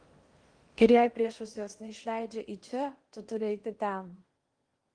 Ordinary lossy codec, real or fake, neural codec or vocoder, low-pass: Opus, 24 kbps; fake; codec, 16 kHz in and 24 kHz out, 0.8 kbps, FocalCodec, streaming, 65536 codes; 9.9 kHz